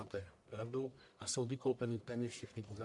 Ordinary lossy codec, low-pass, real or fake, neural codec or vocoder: MP3, 96 kbps; 10.8 kHz; fake; codec, 44.1 kHz, 1.7 kbps, Pupu-Codec